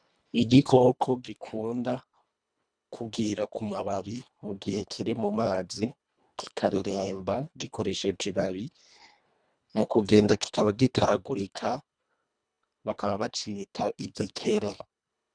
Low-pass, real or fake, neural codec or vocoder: 9.9 kHz; fake; codec, 24 kHz, 1.5 kbps, HILCodec